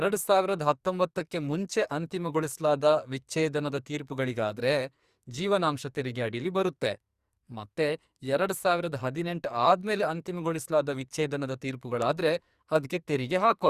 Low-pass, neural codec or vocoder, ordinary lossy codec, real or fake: 14.4 kHz; codec, 44.1 kHz, 2.6 kbps, SNAC; Opus, 64 kbps; fake